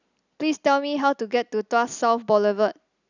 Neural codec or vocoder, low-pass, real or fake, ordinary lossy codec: none; 7.2 kHz; real; none